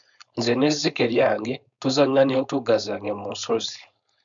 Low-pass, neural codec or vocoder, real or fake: 7.2 kHz; codec, 16 kHz, 4.8 kbps, FACodec; fake